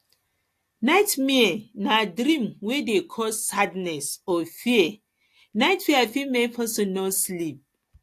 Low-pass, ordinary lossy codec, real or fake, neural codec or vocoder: 14.4 kHz; AAC, 64 kbps; real; none